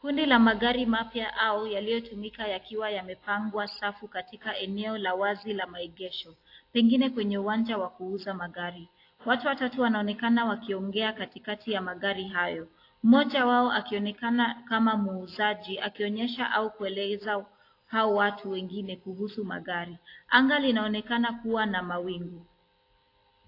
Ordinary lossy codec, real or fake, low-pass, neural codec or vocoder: AAC, 32 kbps; real; 5.4 kHz; none